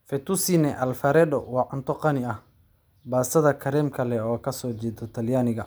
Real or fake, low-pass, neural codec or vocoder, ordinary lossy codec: real; none; none; none